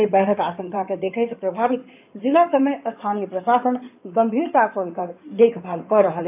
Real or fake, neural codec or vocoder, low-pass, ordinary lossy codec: fake; codec, 16 kHz in and 24 kHz out, 2.2 kbps, FireRedTTS-2 codec; 3.6 kHz; AAC, 32 kbps